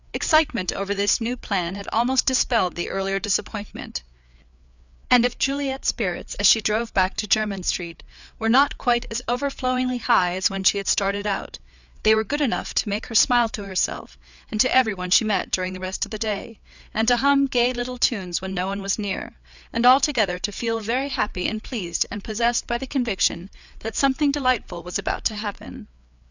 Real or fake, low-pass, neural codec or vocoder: fake; 7.2 kHz; codec, 16 kHz, 4 kbps, FreqCodec, larger model